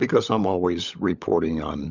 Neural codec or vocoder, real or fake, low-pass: codec, 16 kHz, 16 kbps, FunCodec, trained on LibriTTS, 50 frames a second; fake; 7.2 kHz